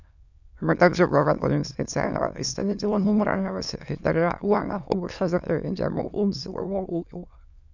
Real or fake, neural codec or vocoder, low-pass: fake; autoencoder, 22.05 kHz, a latent of 192 numbers a frame, VITS, trained on many speakers; 7.2 kHz